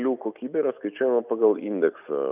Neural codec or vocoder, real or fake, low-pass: none; real; 3.6 kHz